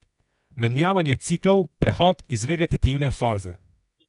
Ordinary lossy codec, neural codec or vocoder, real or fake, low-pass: none; codec, 24 kHz, 0.9 kbps, WavTokenizer, medium music audio release; fake; 10.8 kHz